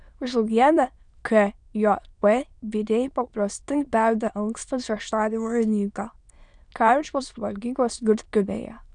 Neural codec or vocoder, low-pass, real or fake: autoencoder, 22.05 kHz, a latent of 192 numbers a frame, VITS, trained on many speakers; 9.9 kHz; fake